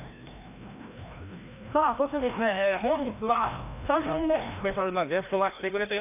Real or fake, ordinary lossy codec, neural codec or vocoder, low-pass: fake; none; codec, 16 kHz, 1 kbps, FreqCodec, larger model; 3.6 kHz